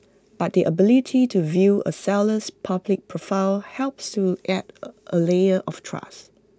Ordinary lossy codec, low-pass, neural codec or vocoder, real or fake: none; none; none; real